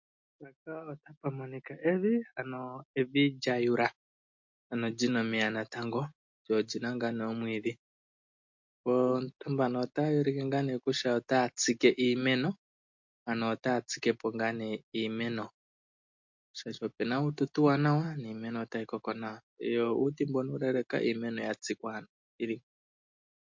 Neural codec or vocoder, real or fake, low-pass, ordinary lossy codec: none; real; 7.2 kHz; MP3, 48 kbps